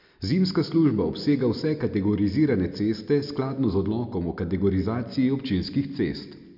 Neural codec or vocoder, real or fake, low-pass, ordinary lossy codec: none; real; 5.4 kHz; none